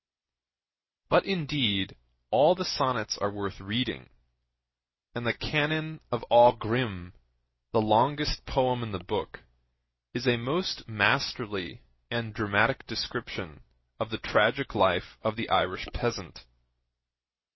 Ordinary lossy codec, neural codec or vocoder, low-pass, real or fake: MP3, 24 kbps; none; 7.2 kHz; real